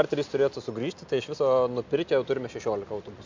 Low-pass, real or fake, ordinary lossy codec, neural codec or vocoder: 7.2 kHz; real; MP3, 64 kbps; none